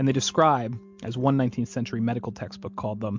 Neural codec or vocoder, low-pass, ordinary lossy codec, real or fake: none; 7.2 kHz; MP3, 64 kbps; real